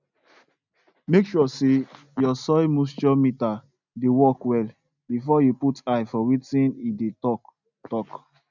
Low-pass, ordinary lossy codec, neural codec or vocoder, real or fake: 7.2 kHz; none; none; real